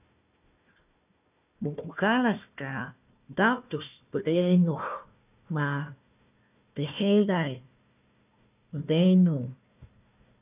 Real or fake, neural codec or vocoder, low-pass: fake; codec, 16 kHz, 1 kbps, FunCodec, trained on Chinese and English, 50 frames a second; 3.6 kHz